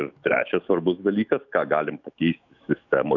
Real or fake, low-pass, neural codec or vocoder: fake; 7.2 kHz; autoencoder, 48 kHz, 128 numbers a frame, DAC-VAE, trained on Japanese speech